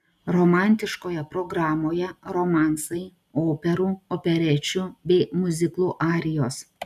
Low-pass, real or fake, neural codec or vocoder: 14.4 kHz; real; none